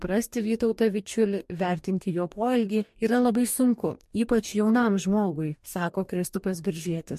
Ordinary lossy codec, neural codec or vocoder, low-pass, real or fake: MP3, 64 kbps; codec, 44.1 kHz, 2.6 kbps, DAC; 14.4 kHz; fake